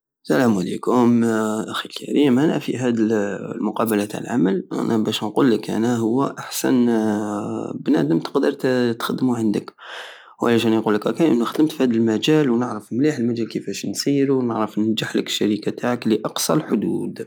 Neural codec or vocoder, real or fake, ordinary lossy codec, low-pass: none; real; none; none